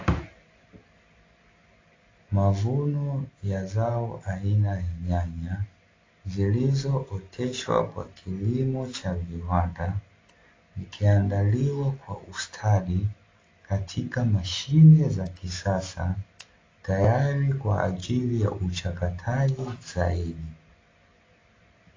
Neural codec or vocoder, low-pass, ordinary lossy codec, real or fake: none; 7.2 kHz; AAC, 32 kbps; real